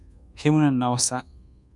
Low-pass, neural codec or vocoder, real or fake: 10.8 kHz; codec, 24 kHz, 1.2 kbps, DualCodec; fake